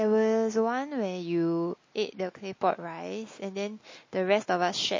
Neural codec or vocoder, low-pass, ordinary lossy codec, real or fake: none; 7.2 kHz; MP3, 32 kbps; real